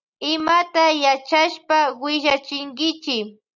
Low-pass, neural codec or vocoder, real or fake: 7.2 kHz; none; real